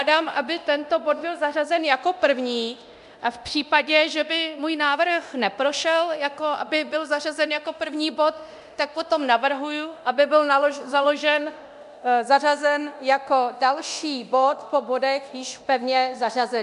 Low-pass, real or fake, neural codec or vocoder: 10.8 kHz; fake; codec, 24 kHz, 0.9 kbps, DualCodec